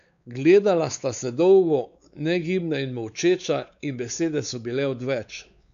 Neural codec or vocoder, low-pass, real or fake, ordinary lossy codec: codec, 16 kHz, 4 kbps, X-Codec, WavLM features, trained on Multilingual LibriSpeech; 7.2 kHz; fake; none